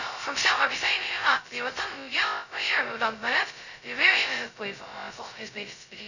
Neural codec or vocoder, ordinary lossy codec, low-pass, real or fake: codec, 16 kHz, 0.2 kbps, FocalCodec; none; 7.2 kHz; fake